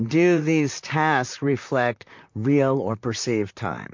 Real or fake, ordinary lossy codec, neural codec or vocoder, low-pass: fake; MP3, 48 kbps; vocoder, 44.1 kHz, 128 mel bands, Pupu-Vocoder; 7.2 kHz